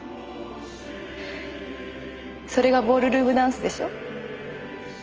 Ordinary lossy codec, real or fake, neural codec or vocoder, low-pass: Opus, 24 kbps; real; none; 7.2 kHz